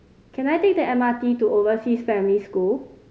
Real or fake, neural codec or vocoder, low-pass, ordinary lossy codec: real; none; none; none